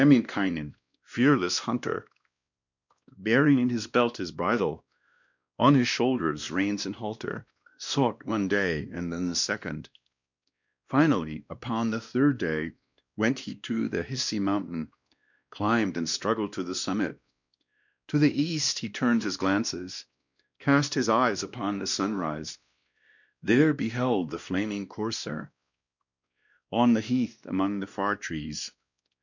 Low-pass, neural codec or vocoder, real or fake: 7.2 kHz; codec, 16 kHz, 1 kbps, X-Codec, WavLM features, trained on Multilingual LibriSpeech; fake